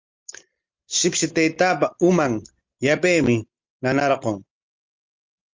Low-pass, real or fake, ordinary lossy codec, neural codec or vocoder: 7.2 kHz; real; Opus, 24 kbps; none